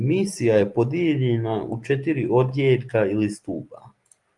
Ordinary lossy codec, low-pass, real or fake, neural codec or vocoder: Opus, 32 kbps; 10.8 kHz; real; none